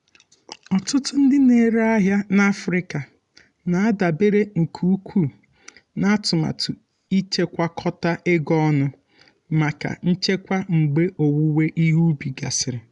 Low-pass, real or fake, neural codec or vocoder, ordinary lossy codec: 10.8 kHz; real; none; none